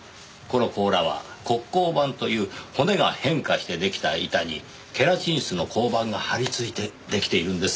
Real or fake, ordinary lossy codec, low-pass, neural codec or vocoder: real; none; none; none